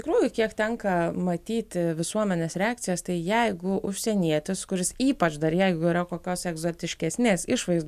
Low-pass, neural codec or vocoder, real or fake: 14.4 kHz; none; real